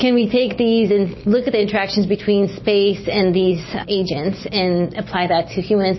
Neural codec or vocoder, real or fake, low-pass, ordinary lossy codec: none; real; 7.2 kHz; MP3, 24 kbps